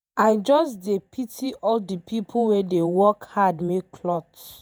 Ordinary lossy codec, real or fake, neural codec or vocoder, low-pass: none; fake; vocoder, 48 kHz, 128 mel bands, Vocos; none